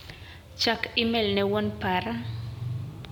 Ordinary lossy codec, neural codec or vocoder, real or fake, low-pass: none; none; real; 19.8 kHz